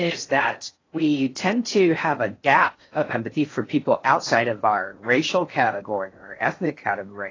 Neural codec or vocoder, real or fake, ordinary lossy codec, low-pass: codec, 16 kHz in and 24 kHz out, 0.6 kbps, FocalCodec, streaming, 4096 codes; fake; AAC, 32 kbps; 7.2 kHz